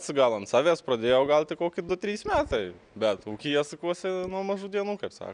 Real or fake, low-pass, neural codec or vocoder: real; 9.9 kHz; none